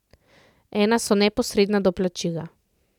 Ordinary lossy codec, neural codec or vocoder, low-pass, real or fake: none; none; 19.8 kHz; real